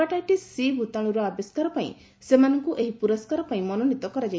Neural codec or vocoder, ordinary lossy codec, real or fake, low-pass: none; none; real; none